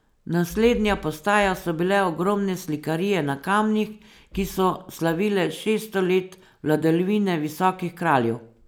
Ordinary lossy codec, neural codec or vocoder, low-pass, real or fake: none; none; none; real